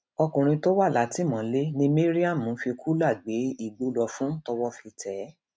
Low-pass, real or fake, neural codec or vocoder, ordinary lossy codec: none; real; none; none